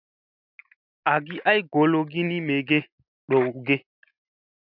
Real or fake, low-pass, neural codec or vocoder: real; 5.4 kHz; none